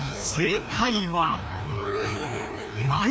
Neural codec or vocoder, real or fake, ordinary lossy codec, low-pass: codec, 16 kHz, 1 kbps, FreqCodec, larger model; fake; none; none